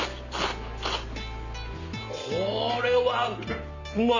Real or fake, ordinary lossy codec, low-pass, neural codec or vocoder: real; none; 7.2 kHz; none